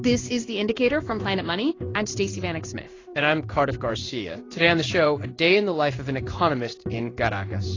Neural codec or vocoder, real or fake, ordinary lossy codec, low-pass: codec, 16 kHz in and 24 kHz out, 1 kbps, XY-Tokenizer; fake; AAC, 32 kbps; 7.2 kHz